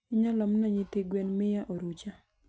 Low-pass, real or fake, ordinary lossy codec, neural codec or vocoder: none; real; none; none